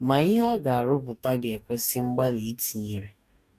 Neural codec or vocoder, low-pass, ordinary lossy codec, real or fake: codec, 44.1 kHz, 2.6 kbps, DAC; 14.4 kHz; none; fake